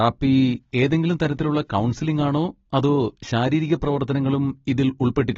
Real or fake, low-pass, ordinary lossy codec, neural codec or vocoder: real; 7.2 kHz; AAC, 24 kbps; none